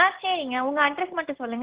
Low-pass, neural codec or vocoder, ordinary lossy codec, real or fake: 3.6 kHz; none; Opus, 16 kbps; real